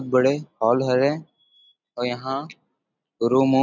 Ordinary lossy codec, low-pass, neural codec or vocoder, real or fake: none; 7.2 kHz; none; real